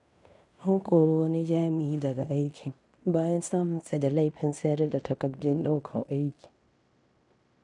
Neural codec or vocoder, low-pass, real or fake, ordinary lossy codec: codec, 16 kHz in and 24 kHz out, 0.9 kbps, LongCat-Audio-Codec, fine tuned four codebook decoder; 10.8 kHz; fake; none